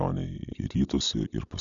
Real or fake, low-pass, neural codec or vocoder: real; 7.2 kHz; none